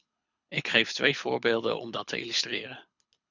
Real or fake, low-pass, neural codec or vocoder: fake; 7.2 kHz; codec, 24 kHz, 6 kbps, HILCodec